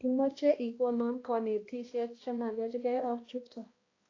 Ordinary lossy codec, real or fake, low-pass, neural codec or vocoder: AAC, 32 kbps; fake; 7.2 kHz; codec, 16 kHz, 1 kbps, X-Codec, HuBERT features, trained on balanced general audio